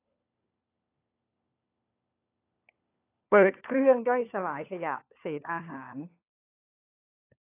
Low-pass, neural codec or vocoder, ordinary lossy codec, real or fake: 3.6 kHz; codec, 16 kHz, 4 kbps, FunCodec, trained on LibriTTS, 50 frames a second; AAC, 24 kbps; fake